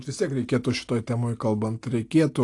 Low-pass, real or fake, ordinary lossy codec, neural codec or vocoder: 10.8 kHz; real; AAC, 48 kbps; none